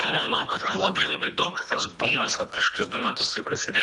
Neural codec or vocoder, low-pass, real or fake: codec, 24 kHz, 1.5 kbps, HILCodec; 10.8 kHz; fake